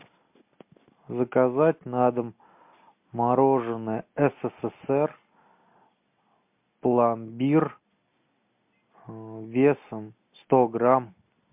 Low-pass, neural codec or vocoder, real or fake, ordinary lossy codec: 3.6 kHz; none; real; MP3, 32 kbps